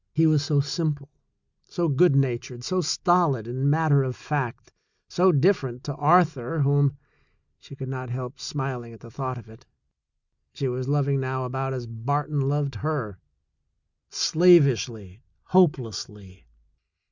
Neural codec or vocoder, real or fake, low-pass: none; real; 7.2 kHz